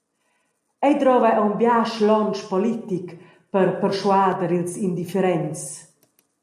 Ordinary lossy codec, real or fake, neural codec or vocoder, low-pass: AAC, 96 kbps; real; none; 14.4 kHz